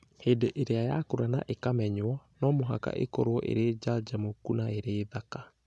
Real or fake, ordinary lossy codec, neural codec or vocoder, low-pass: real; none; none; none